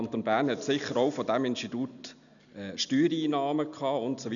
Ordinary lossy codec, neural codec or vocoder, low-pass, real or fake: none; none; 7.2 kHz; real